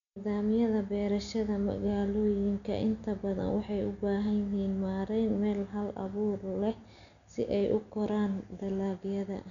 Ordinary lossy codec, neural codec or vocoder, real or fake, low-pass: none; none; real; 7.2 kHz